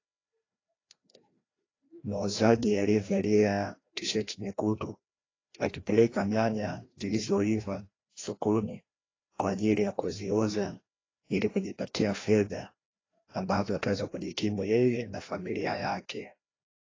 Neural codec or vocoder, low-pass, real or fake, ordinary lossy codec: codec, 16 kHz, 1 kbps, FreqCodec, larger model; 7.2 kHz; fake; AAC, 32 kbps